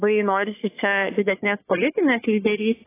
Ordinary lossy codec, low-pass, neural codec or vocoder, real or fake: AAC, 16 kbps; 3.6 kHz; codec, 44.1 kHz, 3.4 kbps, Pupu-Codec; fake